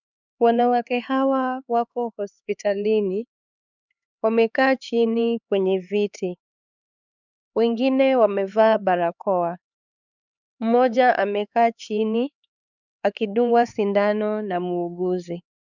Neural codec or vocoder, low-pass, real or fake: codec, 16 kHz, 4 kbps, X-Codec, HuBERT features, trained on LibriSpeech; 7.2 kHz; fake